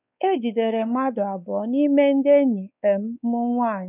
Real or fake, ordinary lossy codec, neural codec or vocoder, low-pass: fake; none; codec, 16 kHz, 4 kbps, X-Codec, WavLM features, trained on Multilingual LibriSpeech; 3.6 kHz